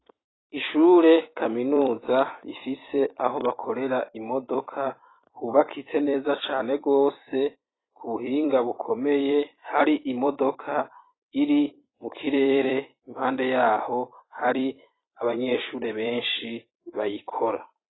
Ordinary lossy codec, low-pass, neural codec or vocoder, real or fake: AAC, 16 kbps; 7.2 kHz; vocoder, 44.1 kHz, 128 mel bands every 256 samples, BigVGAN v2; fake